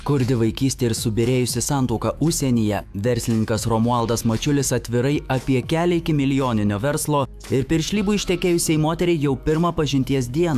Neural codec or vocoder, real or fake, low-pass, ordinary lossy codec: autoencoder, 48 kHz, 128 numbers a frame, DAC-VAE, trained on Japanese speech; fake; 14.4 kHz; AAC, 96 kbps